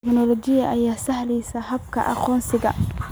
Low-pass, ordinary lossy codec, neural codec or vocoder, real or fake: none; none; none; real